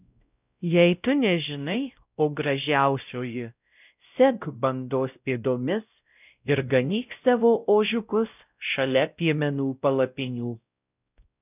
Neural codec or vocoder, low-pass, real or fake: codec, 16 kHz, 0.5 kbps, X-Codec, WavLM features, trained on Multilingual LibriSpeech; 3.6 kHz; fake